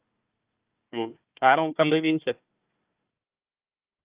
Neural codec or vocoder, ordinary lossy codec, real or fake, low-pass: codec, 16 kHz, 1 kbps, FunCodec, trained on Chinese and English, 50 frames a second; Opus, 24 kbps; fake; 3.6 kHz